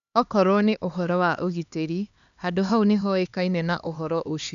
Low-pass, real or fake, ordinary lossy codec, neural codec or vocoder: 7.2 kHz; fake; none; codec, 16 kHz, 2 kbps, X-Codec, HuBERT features, trained on LibriSpeech